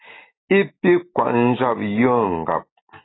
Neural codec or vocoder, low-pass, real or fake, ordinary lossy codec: none; 7.2 kHz; real; AAC, 16 kbps